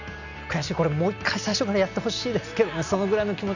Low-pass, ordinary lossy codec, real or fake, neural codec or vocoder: 7.2 kHz; none; real; none